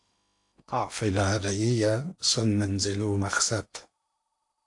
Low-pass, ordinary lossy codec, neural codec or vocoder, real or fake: 10.8 kHz; AAC, 64 kbps; codec, 16 kHz in and 24 kHz out, 0.8 kbps, FocalCodec, streaming, 65536 codes; fake